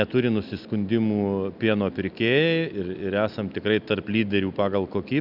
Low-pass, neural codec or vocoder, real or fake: 5.4 kHz; none; real